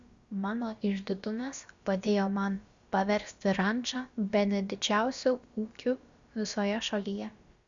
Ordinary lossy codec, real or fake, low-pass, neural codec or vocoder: Opus, 64 kbps; fake; 7.2 kHz; codec, 16 kHz, about 1 kbps, DyCAST, with the encoder's durations